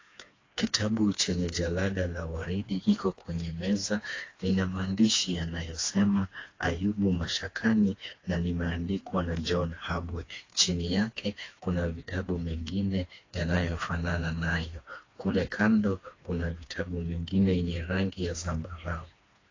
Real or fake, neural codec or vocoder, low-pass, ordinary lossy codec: fake; codec, 16 kHz, 2 kbps, FreqCodec, smaller model; 7.2 kHz; AAC, 32 kbps